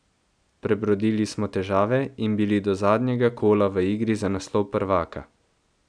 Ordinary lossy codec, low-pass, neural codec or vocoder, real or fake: none; 9.9 kHz; none; real